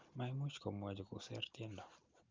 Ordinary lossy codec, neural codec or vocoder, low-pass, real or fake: Opus, 32 kbps; none; 7.2 kHz; real